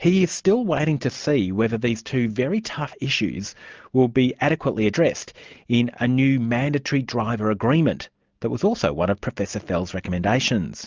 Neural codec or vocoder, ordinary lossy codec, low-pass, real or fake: none; Opus, 24 kbps; 7.2 kHz; real